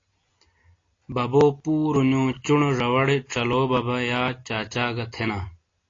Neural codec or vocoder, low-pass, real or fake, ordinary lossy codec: none; 7.2 kHz; real; AAC, 32 kbps